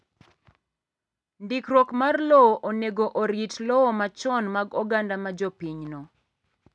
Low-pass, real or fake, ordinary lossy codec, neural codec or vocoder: none; real; none; none